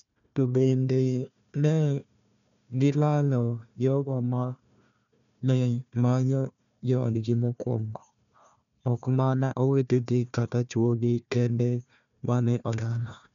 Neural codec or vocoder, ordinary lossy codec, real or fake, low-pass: codec, 16 kHz, 1 kbps, FunCodec, trained on Chinese and English, 50 frames a second; none; fake; 7.2 kHz